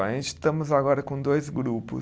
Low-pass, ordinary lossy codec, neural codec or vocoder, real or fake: none; none; none; real